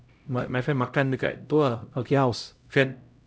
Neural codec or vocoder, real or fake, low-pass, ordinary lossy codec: codec, 16 kHz, 0.5 kbps, X-Codec, HuBERT features, trained on LibriSpeech; fake; none; none